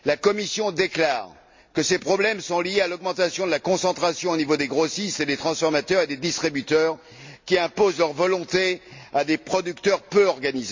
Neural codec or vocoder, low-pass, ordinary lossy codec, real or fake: none; 7.2 kHz; MP3, 48 kbps; real